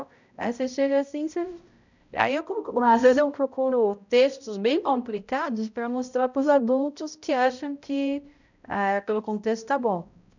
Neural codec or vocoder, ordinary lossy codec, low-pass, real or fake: codec, 16 kHz, 0.5 kbps, X-Codec, HuBERT features, trained on balanced general audio; none; 7.2 kHz; fake